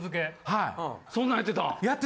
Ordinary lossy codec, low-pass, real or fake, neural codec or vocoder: none; none; real; none